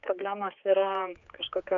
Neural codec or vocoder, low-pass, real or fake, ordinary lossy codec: codec, 16 kHz, 4 kbps, X-Codec, HuBERT features, trained on general audio; 7.2 kHz; fake; AAC, 64 kbps